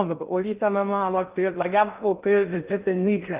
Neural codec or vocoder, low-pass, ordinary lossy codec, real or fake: codec, 16 kHz in and 24 kHz out, 0.6 kbps, FocalCodec, streaming, 2048 codes; 3.6 kHz; Opus, 32 kbps; fake